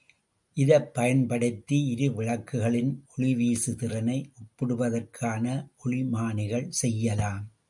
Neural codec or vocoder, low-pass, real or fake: none; 10.8 kHz; real